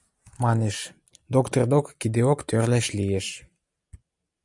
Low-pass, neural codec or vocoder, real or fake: 10.8 kHz; none; real